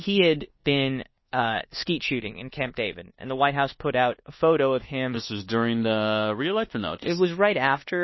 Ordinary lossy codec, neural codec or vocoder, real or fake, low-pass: MP3, 24 kbps; codec, 24 kHz, 1.2 kbps, DualCodec; fake; 7.2 kHz